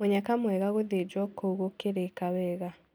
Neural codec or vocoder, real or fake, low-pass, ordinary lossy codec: none; real; none; none